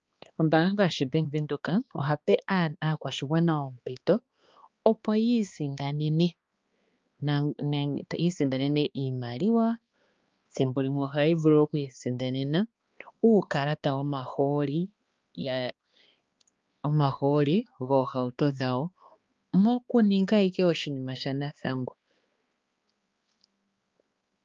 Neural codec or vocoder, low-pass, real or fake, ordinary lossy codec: codec, 16 kHz, 2 kbps, X-Codec, HuBERT features, trained on balanced general audio; 7.2 kHz; fake; Opus, 24 kbps